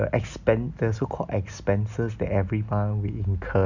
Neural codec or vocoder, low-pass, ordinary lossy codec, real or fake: none; 7.2 kHz; none; real